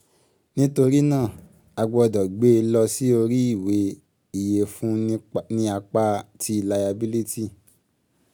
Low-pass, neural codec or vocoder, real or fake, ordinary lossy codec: none; none; real; none